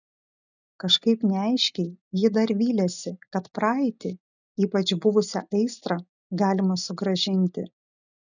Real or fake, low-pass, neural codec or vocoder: real; 7.2 kHz; none